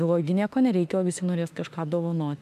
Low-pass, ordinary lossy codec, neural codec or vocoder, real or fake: 14.4 kHz; MP3, 96 kbps; autoencoder, 48 kHz, 32 numbers a frame, DAC-VAE, trained on Japanese speech; fake